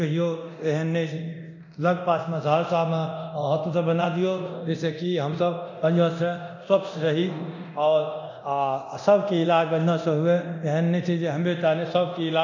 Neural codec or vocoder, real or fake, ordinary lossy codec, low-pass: codec, 24 kHz, 0.9 kbps, DualCodec; fake; none; 7.2 kHz